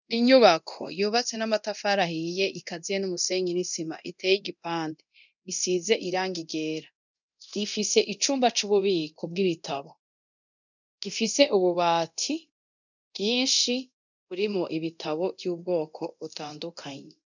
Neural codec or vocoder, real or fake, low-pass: codec, 24 kHz, 0.9 kbps, DualCodec; fake; 7.2 kHz